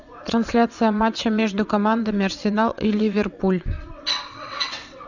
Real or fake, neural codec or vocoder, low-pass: fake; vocoder, 22.05 kHz, 80 mel bands, WaveNeXt; 7.2 kHz